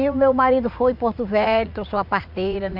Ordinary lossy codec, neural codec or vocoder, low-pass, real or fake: none; vocoder, 44.1 kHz, 80 mel bands, Vocos; 5.4 kHz; fake